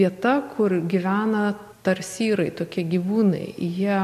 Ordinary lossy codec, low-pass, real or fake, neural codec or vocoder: MP3, 64 kbps; 14.4 kHz; real; none